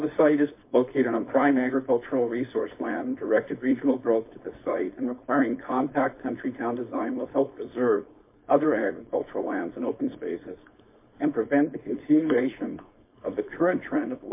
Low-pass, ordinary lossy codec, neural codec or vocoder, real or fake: 3.6 kHz; MP3, 24 kbps; codec, 16 kHz, 4.8 kbps, FACodec; fake